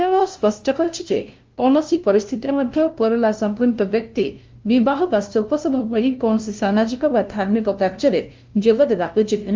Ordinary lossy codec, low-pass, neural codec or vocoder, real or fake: Opus, 32 kbps; 7.2 kHz; codec, 16 kHz, 0.5 kbps, FunCodec, trained on LibriTTS, 25 frames a second; fake